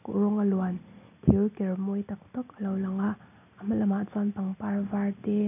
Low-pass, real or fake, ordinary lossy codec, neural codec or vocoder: 3.6 kHz; real; none; none